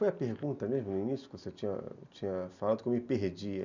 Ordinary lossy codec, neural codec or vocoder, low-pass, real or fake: none; none; 7.2 kHz; real